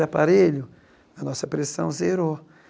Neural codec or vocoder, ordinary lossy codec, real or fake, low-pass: none; none; real; none